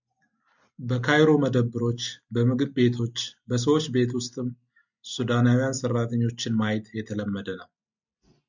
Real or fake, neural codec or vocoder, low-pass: real; none; 7.2 kHz